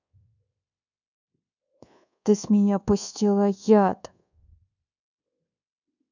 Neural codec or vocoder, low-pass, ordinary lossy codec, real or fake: codec, 24 kHz, 1.2 kbps, DualCodec; 7.2 kHz; none; fake